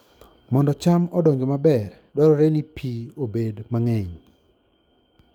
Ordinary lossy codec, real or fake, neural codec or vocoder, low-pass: none; fake; codec, 44.1 kHz, 7.8 kbps, DAC; 19.8 kHz